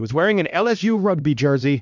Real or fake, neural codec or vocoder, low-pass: fake; codec, 16 kHz, 1 kbps, X-Codec, HuBERT features, trained on LibriSpeech; 7.2 kHz